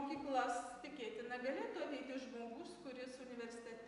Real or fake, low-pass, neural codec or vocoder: real; 10.8 kHz; none